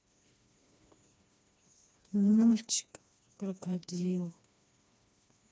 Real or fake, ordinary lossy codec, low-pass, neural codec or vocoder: fake; none; none; codec, 16 kHz, 2 kbps, FreqCodec, smaller model